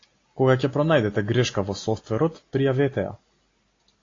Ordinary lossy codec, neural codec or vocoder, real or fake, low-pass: AAC, 32 kbps; none; real; 7.2 kHz